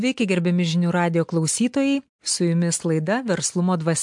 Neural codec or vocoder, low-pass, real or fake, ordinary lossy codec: none; 10.8 kHz; real; MP3, 64 kbps